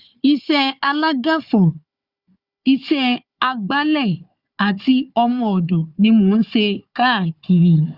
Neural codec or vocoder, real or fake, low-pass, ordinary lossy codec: codec, 16 kHz, 4 kbps, FunCodec, trained on Chinese and English, 50 frames a second; fake; 5.4 kHz; Opus, 64 kbps